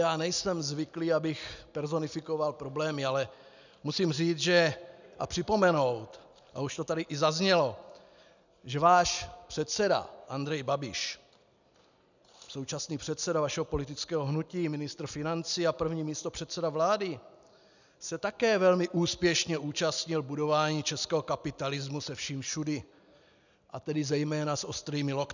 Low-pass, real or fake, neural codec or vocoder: 7.2 kHz; real; none